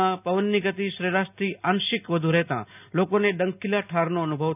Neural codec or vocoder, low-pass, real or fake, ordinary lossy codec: none; 3.6 kHz; real; none